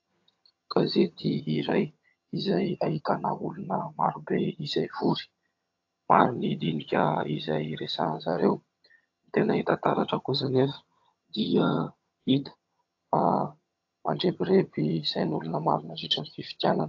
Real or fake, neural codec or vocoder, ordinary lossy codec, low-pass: fake; vocoder, 22.05 kHz, 80 mel bands, HiFi-GAN; AAC, 48 kbps; 7.2 kHz